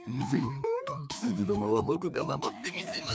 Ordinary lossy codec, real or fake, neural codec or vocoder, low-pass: none; fake; codec, 16 kHz, 2 kbps, FreqCodec, larger model; none